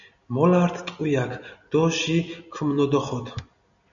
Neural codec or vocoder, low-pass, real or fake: none; 7.2 kHz; real